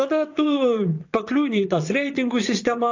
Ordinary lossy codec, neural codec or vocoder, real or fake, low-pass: AAC, 48 kbps; vocoder, 22.05 kHz, 80 mel bands, WaveNeXt; fake; 7.2 kHz